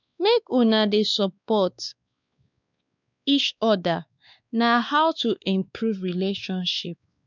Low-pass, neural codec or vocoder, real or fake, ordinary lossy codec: 7.2 kHz; codec, 16 kHz, 2 kbps, X-Codec, WavLM features, trained on Multilingual LibriSpeech; fake; none